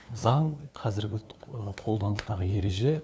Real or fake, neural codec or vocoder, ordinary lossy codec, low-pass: fake; codec, 16 kHz, 2 kbps, FunCodec, trained on LibriTTS, 25 frames a second; none; none